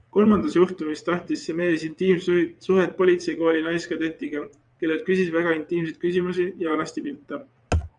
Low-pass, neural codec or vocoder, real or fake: 9.9 kHz; vocoder, 22.05 kHz, 80 mel bands, WaveNeXt; fake